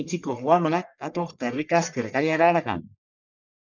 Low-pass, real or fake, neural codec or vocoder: 7.2 kHz; fake; codec, 44.1 kHz, 1.7 kbps, Pupu-Codec